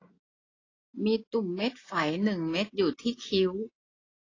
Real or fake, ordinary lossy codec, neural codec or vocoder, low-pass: real; AAC, 32 kbps; none; 7.2 kHz